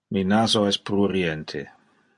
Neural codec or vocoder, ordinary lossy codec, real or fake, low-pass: none; MP3, 48 kbps; real; 10.8 kHz